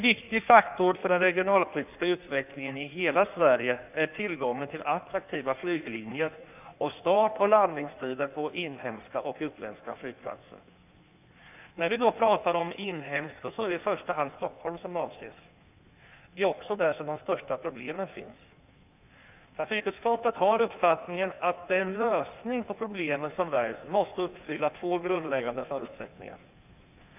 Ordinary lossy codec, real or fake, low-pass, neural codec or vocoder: none; fake; 3.6 kHz; codec, 16 kHz in and 24 kHz out, 1.1 kbps, FireRedTTS-2 codec